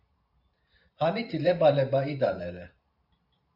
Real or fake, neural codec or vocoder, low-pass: fake; vocoder, 44.1 kHz, 128 mel bands every 512 samples, BigVGAN v2; 5.4 kHz